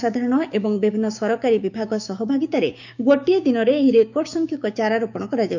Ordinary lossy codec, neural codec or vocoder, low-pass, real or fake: none; codec, 16 kHz, 6 kbps, DAC; 7.2 kHz; fake